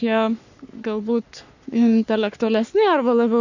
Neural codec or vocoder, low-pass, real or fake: codec, 44.1 kHz, 3.4 kbps, Pupu-Codec; 7.2 kHz; fake